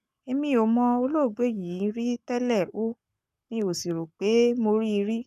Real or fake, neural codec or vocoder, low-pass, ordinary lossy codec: fake; codec, 44.1 kHz, 7.8 kbps, Pupu-Codec; 14.4 kHz; none